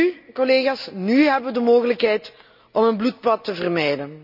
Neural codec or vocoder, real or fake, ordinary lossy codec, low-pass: none; real; none; 5.4 kHz